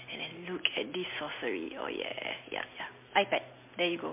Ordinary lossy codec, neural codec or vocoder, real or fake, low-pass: MP3, 24 kbps; none; real; 3.6 kHz